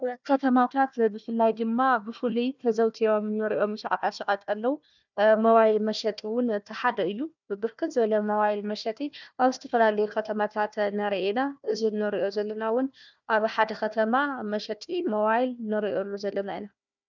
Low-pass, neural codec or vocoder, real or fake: 7.2 kHz; codec, 16 kHz, 1 kbps, FunCodec, trained on Chinese and English, 50 frames a second; fake